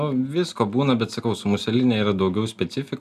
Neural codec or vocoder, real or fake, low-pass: none; real; 14.4 kHz